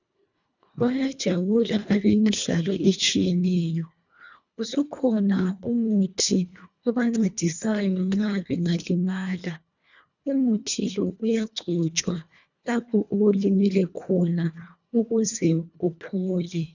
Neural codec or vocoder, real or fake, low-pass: codec, 24 kHz, 1.5 kbps, HILCodec; fake; 7.2 kHz